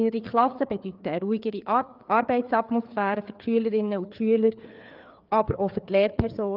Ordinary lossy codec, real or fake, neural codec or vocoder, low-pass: Opus, 24 kbps; fake; codec, 16 kHz, 4 kbps, FreqCodec, larger model; 5.4 kHz